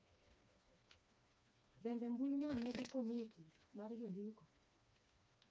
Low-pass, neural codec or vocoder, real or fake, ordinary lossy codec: none; codec, 16 kHz, 2 kbps, FreqCodec, smaller model; fake; none